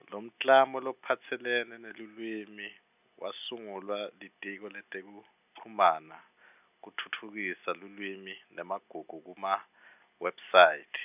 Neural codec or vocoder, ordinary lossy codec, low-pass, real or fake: none; none; 3.6 kHz; real